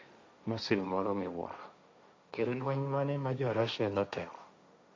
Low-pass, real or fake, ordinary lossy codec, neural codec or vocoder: none; fake; none; codec, 16 kHz, 1.1 kbps, Voila-Tokenizer